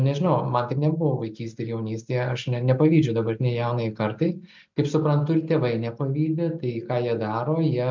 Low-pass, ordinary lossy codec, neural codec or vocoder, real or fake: 7.2 kHz; MP3, 64 kbps; none; real